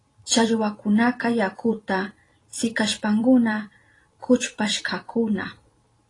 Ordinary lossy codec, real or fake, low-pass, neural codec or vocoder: AAC, 32 kbps; fake; 10.8 kHz; vocoder, 44.1 kHz, 128 mel bands every 256 samples, BigVGAN v2